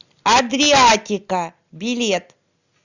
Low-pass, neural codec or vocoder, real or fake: 7.2 kHz; none; real